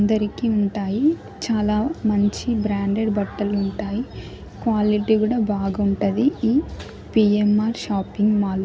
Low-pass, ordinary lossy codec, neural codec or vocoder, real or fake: none; none; none; real